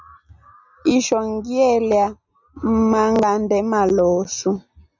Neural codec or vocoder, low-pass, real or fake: none; 7.2 kHz; real